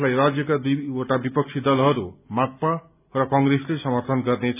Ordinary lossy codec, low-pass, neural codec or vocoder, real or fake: none; 3.6 kHz; none; real